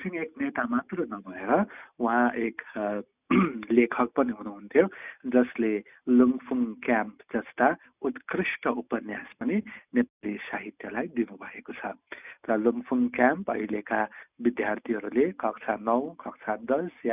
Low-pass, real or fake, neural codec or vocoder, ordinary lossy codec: 3.6 kHz; real; none; none